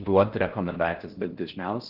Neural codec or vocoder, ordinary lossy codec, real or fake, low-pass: codec, 16 kHz in and 24 kHz out, 0.6 kbps, FocalCodec, streaming, 4096 codes; Opus, 16 kbps; fake; 5.4 kHz